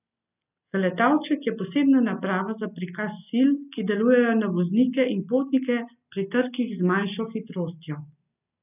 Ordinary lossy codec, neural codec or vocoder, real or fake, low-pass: none; none; real; 3.6 kHz